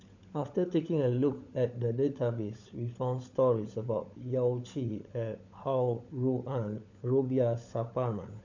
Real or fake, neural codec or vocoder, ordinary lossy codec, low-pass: fake; codec, 16 kHz, 16 kbps, FunCodec, trained on LibriTTS, 50 frames a second; none; 7.2 kHz